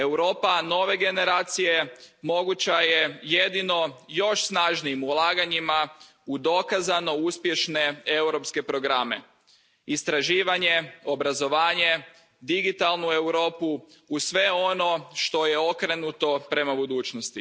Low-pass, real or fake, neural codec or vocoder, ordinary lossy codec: none; real; none; none